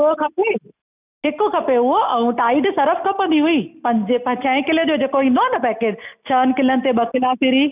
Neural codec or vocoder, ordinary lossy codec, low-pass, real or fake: none; none; 3.6 kHz; real